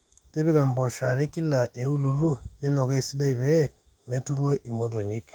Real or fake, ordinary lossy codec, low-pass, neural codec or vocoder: fake; Opus, 32 kbps; 19.8 kHz; autoencoder, 48 kHz, 32 numbers a frame, DAC-VAE, trained on Japanese speech